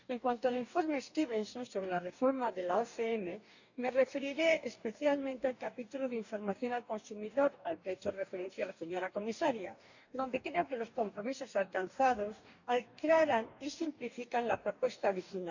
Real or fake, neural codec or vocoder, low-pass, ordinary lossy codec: fake; codec, 44.1 kHz, 2.6 kbps, DAC; 7.2 kHz; none